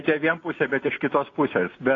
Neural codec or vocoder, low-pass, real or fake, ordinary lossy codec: none; 7.2 kHz; real; AAC, 32 kbps